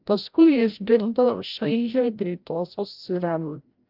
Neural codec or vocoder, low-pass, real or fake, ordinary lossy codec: codec, 16 kHz, 0.5 kbps, FreqCodec, larger model; 5.4 kHz; fake; Opus, 24 kbps